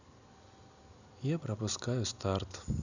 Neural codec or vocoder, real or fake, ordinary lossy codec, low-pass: none; real; none; 7.2 kHz